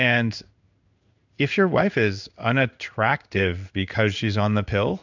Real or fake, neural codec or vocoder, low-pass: fake; codec, 16 kHz in and 24 kHz out, 1 kbps, XY-Tokenizer; 7.2 kHz